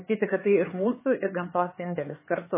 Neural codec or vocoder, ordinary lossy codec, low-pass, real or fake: codec, 16 kHz, 4 kbps, X-Codec, HuBERT features, trained on LibriSpeech; MP3, 16 kbps; 3.6 kHz; fake